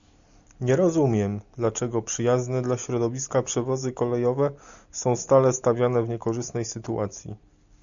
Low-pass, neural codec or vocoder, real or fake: 7.2 kHz; none; real